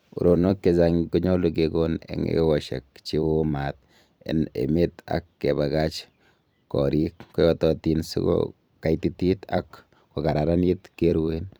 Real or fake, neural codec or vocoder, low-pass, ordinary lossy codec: fake; vocoder, 44.1 kHz, 128 mel bands every 256 samples, BigVGAN v2; none; none